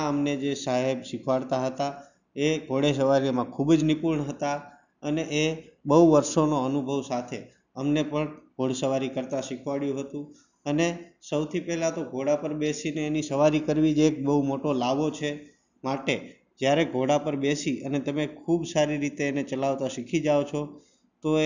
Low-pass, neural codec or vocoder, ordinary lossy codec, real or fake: 7.2 kHz; none; none; real